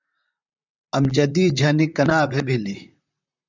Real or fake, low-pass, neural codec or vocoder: fake; 7.2 kHz; vocoder, 44.1 kHz, 128 mel bands, Pupu-Vocoder